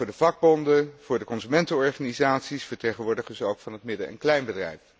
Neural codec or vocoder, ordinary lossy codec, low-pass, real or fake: none; none; none; real